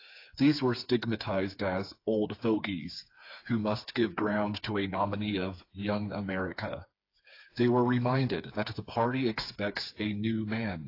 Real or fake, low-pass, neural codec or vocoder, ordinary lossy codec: fake; 5.4 kHz; codec, 16 kHz, 4 kbps, FreqCodec, smaller model; AAC, 32 kbps